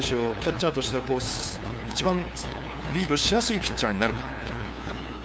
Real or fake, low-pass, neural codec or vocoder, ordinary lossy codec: fake; none; codec, 16 kHz, 2 kbps, FunCodec, trained on LibriTTS, 25 frames a second; none